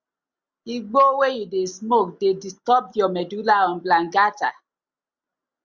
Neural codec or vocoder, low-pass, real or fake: none; 7.2 kHz; real